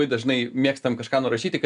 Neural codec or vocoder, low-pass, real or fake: none; 10.8 kHz; real